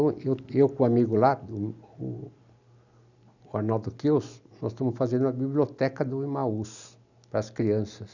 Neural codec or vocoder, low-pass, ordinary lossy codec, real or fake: none; 7.2 kHz; none; real